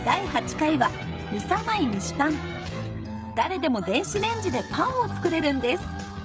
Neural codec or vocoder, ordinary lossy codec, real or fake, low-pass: codec, 16 kHz, 16 kbps, FreqCodec, smaller model; none; fake; none